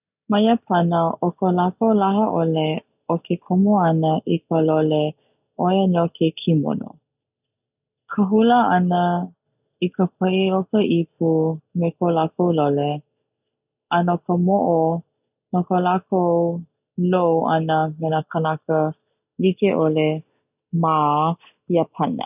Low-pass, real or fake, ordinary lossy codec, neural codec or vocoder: 3.6 kHz; real; none; none